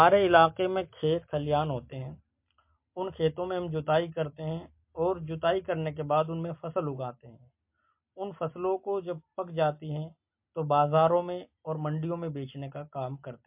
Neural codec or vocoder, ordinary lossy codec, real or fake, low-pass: none; MP3, 32 kbps; real; 3.6 kHz